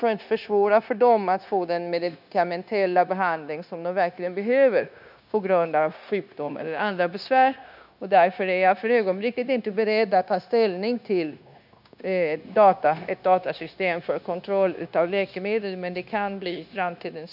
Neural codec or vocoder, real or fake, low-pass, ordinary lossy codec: codec, 16 kHz, 0.9 kbps, LongCat-Audio-Codec; fake; 5.4 kHz; none